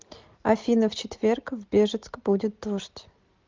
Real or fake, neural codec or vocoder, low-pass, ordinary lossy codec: real; none; 7.2 kHz; Opus, 24 kbps